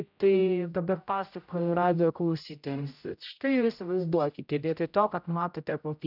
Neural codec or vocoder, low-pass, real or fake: codec, 16 kHz, 0.5 kbps, X-Codec, HuBERT features, trained on general audio; 5.4 kHz; fake